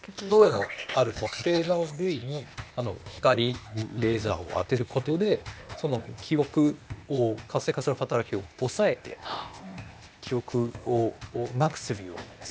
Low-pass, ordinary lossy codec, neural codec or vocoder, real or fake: none; none; codec, 16 kHz, 0.8 kbps, ZipCodec; fake